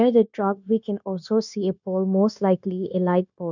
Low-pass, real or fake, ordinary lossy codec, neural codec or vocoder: 7.2 kHz; fake; none; codec, 16 kHz, 0.9 kbps, LongCat-Audio-Codec